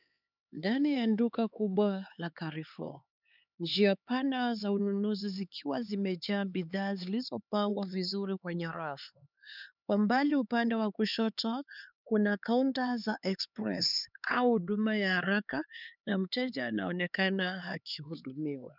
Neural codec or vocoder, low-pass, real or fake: codec, 16 kHz, 4 kbps, X-Codec, HuBERT features, trained on LibriSpeech; 5.4 kHz; fake